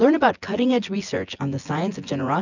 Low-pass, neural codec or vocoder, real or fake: 7.2 kHz; vocoder, 24 kHz, 100 mel bands, Vocos; fake